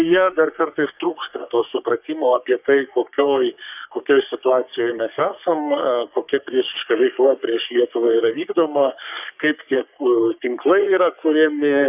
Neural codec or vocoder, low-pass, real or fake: codec, 44.1 kHz, 3.4 kbps, Pupu-Codec; 3.6 kHz; fake